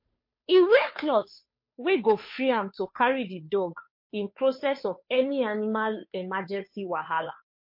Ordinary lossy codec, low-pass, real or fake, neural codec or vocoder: MP3, 32 kbps; 5.4 kHz; fake; codec, 16 kHz, 2 kbps, FunCodec, trained on Chinese and English, 25 frames a second